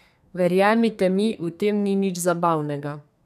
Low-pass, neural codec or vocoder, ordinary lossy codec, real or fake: 14.4 kHz; codec, 32 kHz, 1.9 kbps, SNAC; none; fake